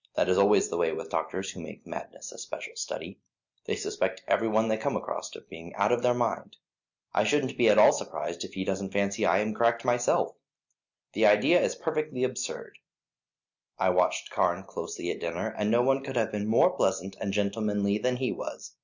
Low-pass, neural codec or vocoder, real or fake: 7.2 kHz; none; real